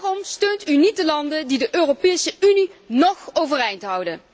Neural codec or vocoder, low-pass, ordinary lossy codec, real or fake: none; none; none; real